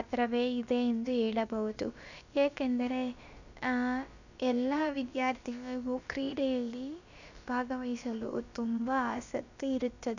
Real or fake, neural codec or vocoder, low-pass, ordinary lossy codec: fake; codec, 16 kHz, about 1 kbps, DyCAST, with the encoder's durations; 7.2 kHz; none